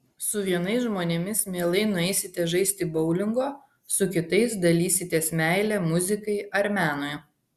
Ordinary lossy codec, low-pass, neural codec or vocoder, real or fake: Opus, 64 kbps; 14.4 kHz; none; real